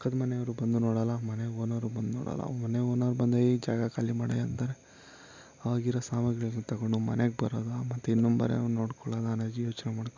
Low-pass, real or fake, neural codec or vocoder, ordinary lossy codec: 7.2 kHz; real; none; MP3, 64 kbps